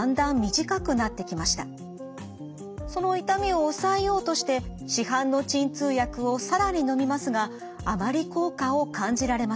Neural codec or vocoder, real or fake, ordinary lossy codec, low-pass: none; real; none; none